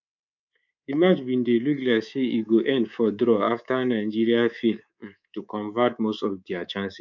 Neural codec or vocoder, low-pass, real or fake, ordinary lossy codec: codec, 24 kHz, 3.1 kbps, DualCodec; 7.2 kHz; fake; none